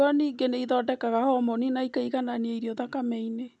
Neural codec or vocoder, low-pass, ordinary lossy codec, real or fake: none; none; none; real